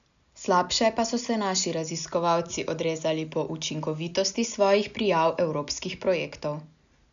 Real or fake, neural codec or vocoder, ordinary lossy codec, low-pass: real; none; none; 7.2 kHz